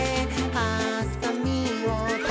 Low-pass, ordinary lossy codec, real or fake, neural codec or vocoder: none; none; real; none